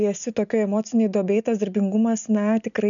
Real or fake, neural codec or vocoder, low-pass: real; none; 7.2 kHz